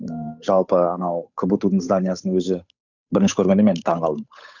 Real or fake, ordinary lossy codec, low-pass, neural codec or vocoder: fake; none; 7.2 kHz; codec, 16 kHz, 8 kbps, FunCodec, trained on Chinese and English, 25 frames a second